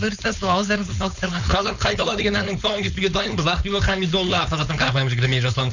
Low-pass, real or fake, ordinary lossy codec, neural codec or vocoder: 7.2 kHz; fake; none; codec, 16 kHz, 4.8 kbps, FACodec